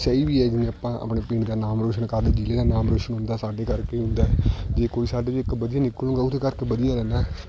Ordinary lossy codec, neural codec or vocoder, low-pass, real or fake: none; none; none; real